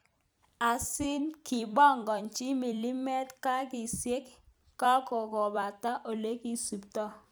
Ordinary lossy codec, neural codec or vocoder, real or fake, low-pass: none; vocoder, 44.1 kHz, 128 mel bands every 256 samples, BigVGAN v2; fake; none